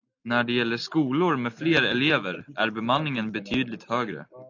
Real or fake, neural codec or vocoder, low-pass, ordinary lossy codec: real; none; 7.2 kHz; AAC, 48 kbps